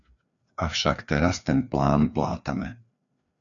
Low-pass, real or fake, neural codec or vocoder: 7.2 kHz; fake; codec, 16 kHz, 2 kbps, FreqCodec, larger model